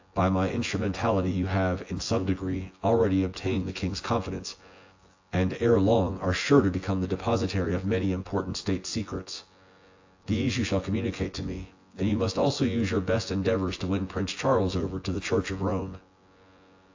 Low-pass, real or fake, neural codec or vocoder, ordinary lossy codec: 7.2 kHz; fake; vocoder, 24 kHz, 100 mel bands, Vocos; AAC, 48 kbps